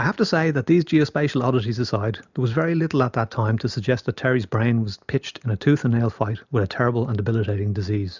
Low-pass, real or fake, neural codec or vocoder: 7.2 kHz; real; none